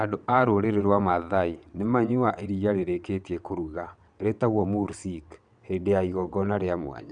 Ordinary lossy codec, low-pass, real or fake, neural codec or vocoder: none; 9.9 kHz; fake; vocoder, 22.05 kHz, 80 mel bands, WaveNeXt